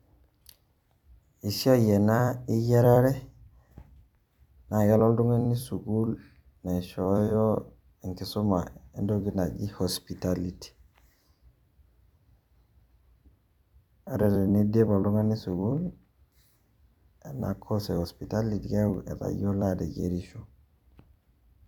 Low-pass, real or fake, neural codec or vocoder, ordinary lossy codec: 19.8 kHz; fake; vocoder, 44.1 kHz, 128 mel bands every 256 samples, BigVGAN v2; none